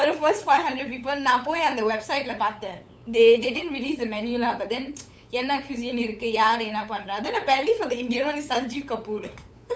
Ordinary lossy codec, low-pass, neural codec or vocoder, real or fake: none; none; codec, 16 kHz, 8 kbps, FunCodec, trained on LibriTTS, 25 frames a second; fake